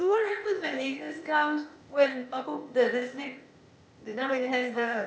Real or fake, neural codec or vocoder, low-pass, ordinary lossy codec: fake; codec, 16 kHz, 0.8 kbps, ZipCodec; none; none